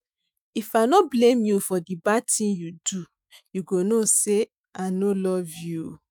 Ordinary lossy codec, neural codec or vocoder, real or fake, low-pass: none; autoencoder, 48 kHz, 128 numbers a frame, DAC-VAE, trained on Japanese speech; fake; none